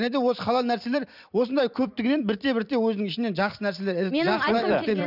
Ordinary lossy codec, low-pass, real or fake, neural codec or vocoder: none; 5.4 kHz; fake; vocoder, 44.1 kHz, 128 mel bands every 256 samples, BigVGAN v2